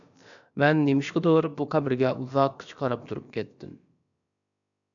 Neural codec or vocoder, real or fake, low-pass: codec, 16 kHz, about 1 kbps, DyCAST, with the encoder's durations; fake; 7.2 kHz